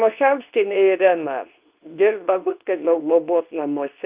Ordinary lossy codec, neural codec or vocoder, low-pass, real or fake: Opus, 32 kbps; codec, 24 kHz, 0.9 kbps, WavTokenizer, medium speech release version 2; 3.6 kHz; fake